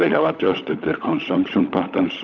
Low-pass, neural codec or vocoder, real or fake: 7.2 kHz; codec, 16 kHz, 16 kbps, FunCodec, trained on LibriTTS, 50 frames a second; fake